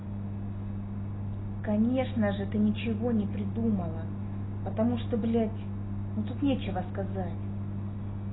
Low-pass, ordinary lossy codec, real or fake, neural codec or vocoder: 7.2 kHz; AAC, 16 kbps; real; none